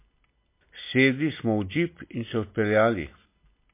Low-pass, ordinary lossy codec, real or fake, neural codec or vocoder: 3.6 kHz; MP3, 24 kbps; real; none